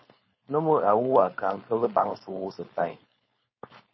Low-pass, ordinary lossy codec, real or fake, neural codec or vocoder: 7.2 kHz; MP3, 24 kbps; fake; codec, 16 kHz, 4.8 kbps, FACodec